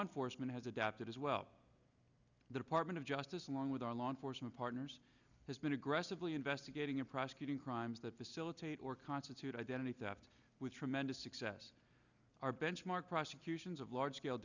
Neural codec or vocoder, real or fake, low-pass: none; real; 7.2 kHz